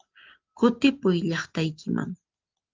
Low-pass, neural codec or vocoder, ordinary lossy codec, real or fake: 7.2 kHz; none; Opus, 32 kbps; real